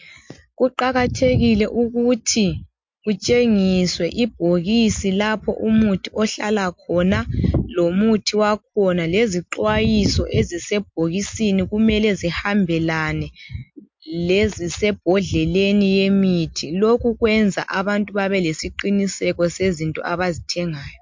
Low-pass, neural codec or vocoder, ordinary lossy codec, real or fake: 7.2 kHz; none; MP3, 48 kbps; real